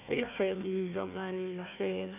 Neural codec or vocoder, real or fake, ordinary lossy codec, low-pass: codec, 16 kHz, 1 kbps, FunCodec, trained on Chinese and English, 50 frames a second; fake; none; 3.6 kHz